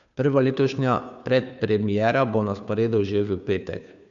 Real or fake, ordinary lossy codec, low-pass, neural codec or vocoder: fake; none; 7.2 kHz; codec, 16 kHz, 2 kbps, FunCodec, trained on Chinese and English, 25 frames a second